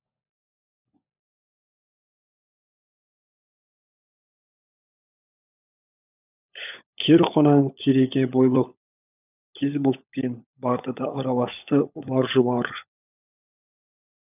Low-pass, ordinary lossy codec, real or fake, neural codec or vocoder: 3.6 kHz; none; fake; codec, 16 kHz, 16 kbps, FunCodec, trained on LibriTTS, 50 frames a second